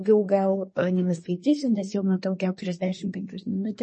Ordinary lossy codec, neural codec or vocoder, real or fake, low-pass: MP3, 32 kbps; codec, 24 kHz, 1 kbps, SNAC; fake; 10.8 kHz